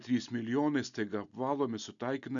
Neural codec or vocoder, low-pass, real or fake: none; 7.2 kHz; real